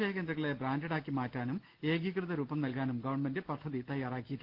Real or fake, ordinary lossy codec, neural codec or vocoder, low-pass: real; Opus, 16 kbps; none; 5.4 kHz